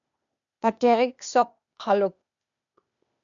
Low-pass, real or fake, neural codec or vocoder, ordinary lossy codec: 7.2 kHz; fake; codec, 16 kHz, 0.8 kbps, ZipCodec; MP3, 96 kbps